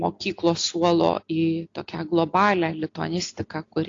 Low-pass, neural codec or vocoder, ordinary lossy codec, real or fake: 7.2 kHz; none; AAC, 48 kbps; real